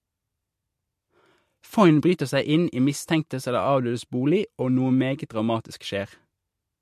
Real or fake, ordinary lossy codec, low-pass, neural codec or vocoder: real; MP3, 64 kbps; 14.4 kHz; none